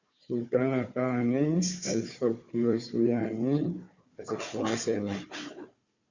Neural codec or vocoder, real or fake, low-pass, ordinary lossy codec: codec, 16 kHz, 4 kbps, FunCodec, trained on Chinese and English, 50 frames a second; fake; 7.2 kHz; Opus, 64 kbps